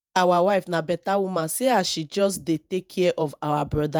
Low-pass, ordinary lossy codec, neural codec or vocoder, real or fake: none; none; vocoder, 48 kHz, 128 mel bands, Vocos; fake